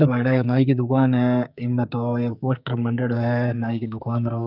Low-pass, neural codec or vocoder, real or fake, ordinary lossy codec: 5.4 kHz; codec, 44.1 kHz, 2.6 kbps, SNAC; fake; none